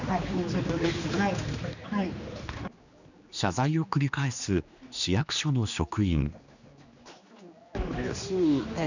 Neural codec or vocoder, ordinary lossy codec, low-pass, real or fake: codec, 16 kHz, 2 kbps, X-Codec, HuBERT features, trained on general audio; none; 7.2 kHz; fake